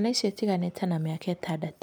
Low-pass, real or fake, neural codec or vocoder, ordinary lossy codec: none; real; none; none